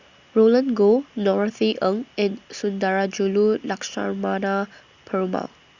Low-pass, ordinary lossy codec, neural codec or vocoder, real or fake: 7.2 kHz; Opus, 64 kbps; none; real